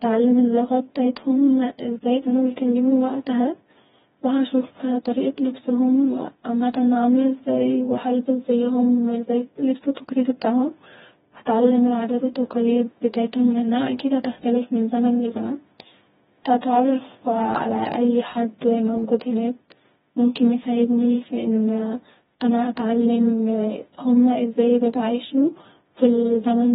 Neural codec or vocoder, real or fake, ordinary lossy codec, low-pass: codec, 16 kHz, 2 kbps, FreqCodec, smaller model; fake; AAC, 16 kbps; 7.2 kHz